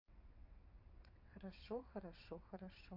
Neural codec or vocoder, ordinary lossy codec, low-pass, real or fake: vocoder, 22.05 kHz, 80 mel bands, WaveNeXt; MP3, 32 kbps; 5.4 kHz; fake